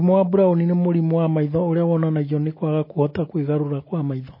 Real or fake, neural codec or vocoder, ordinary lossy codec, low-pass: real; none; MP3, 24 kbps; 5.4 kHz